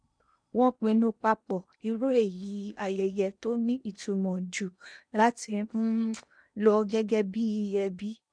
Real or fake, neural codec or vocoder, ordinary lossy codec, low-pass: fake; codec, 16 kHz in and 24 kHz out, 0.8 kbps, FocalCodec, streaming, 65536 codes; none; 9.9 kHz